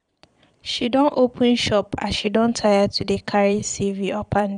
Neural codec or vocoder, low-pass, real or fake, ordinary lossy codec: vocoder, 22.05 kHz, 80 mel bands, Vocos; 9.9 kHz; fake; none